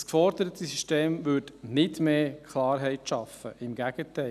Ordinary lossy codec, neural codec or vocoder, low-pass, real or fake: none; none; 14.4 kHz; real